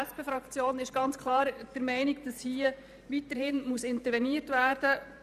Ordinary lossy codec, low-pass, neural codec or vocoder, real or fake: none; 14.4 kHz; vocoder, 44.1 kHz, 128 mel bands every 512 samples, BigVGAN v2; fake